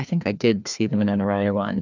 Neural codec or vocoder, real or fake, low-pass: codec, 16 kHz, 2 kbps, FreqCodec, larger model; fake; 7.2 kHz